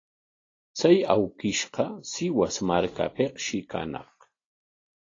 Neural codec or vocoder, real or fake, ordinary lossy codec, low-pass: none; real; AAC, 32 kbps; 7.2 kHz